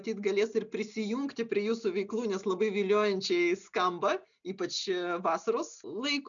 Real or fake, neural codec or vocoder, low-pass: real; none; 7.2 kHz